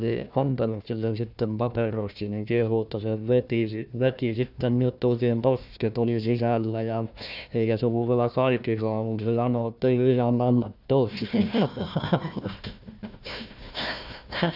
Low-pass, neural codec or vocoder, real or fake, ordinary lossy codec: 5.4 kHz; codec, 16 kHz, 1 kbps, FunCodec, trained on Chinese and English, 50 frames a second; fake; none